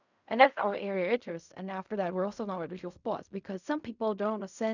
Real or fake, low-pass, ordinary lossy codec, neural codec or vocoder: fake; 7.2 kHz; none; codec, 16 kHz in and 24 kHz out, 0.4 kbps, LongCat-Audio-Codec, fine tuned four codebook decoder